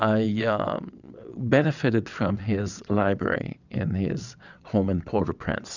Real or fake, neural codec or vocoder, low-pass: fake; vocoder, 22.05 kHz, 80 mel bands, WaveNeXt; 7.2 kHz